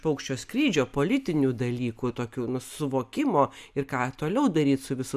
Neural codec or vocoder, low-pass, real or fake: none; 14.4 kHz; real